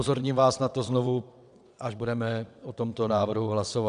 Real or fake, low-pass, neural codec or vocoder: fake; 9.9 kHz; vocoder, 22.05 kHz, 80 mel bands, WaveNeXt